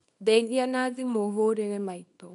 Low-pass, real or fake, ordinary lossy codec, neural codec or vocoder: 10.8 kHz; fake; none; codec, 24 kHz, 0.9 kbps, WavTokenizer, small release